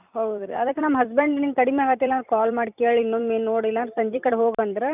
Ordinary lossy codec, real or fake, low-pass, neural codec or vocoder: none; fake; 3.6 kHz; vocoder, 44.1 kHz, 128 mel bands every 256 samples, BigVGAN v2